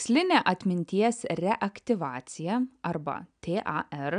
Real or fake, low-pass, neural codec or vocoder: real; 9.9 kHz; none